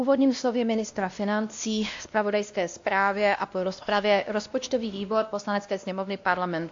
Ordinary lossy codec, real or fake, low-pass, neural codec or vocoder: AAC, 48 kbps; fake; 7.2 kHz; codec, 16 kHz, 1 kbps, X-Codec, WavLM features, trained on Multilingual LibriSpeech